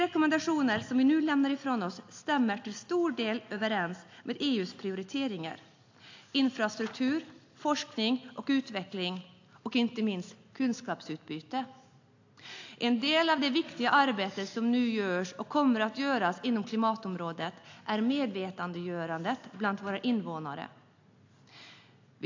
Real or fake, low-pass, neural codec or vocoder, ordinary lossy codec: real; 7.2 kHz; none; AAC, 48 kbps